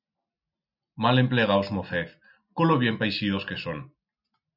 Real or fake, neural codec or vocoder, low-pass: real; none; 5.4 kHz